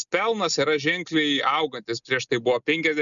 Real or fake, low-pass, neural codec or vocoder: real; 7.2 kHz; none